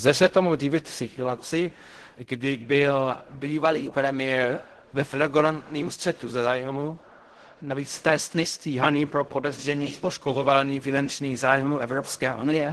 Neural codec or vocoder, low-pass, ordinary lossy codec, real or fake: codec, 16 kHz in and 24 kHz out, 0.4 kbps, LongCat-Audio-Codec, fine tuned four codebook decoder; 10.8 kHz; Opus, 16 kbps; fake